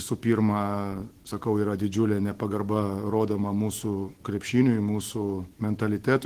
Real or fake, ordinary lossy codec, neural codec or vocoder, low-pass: fake; Opus, 16 kbps; autoencoder, 48 kHz, 128 numbers a frame, DAC-VAE, trained on Japanese speech; 14.4 kHz